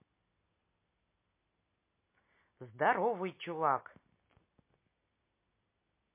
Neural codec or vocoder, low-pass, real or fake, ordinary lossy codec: none; 3.6 kHz; real; MP3, 24 kbps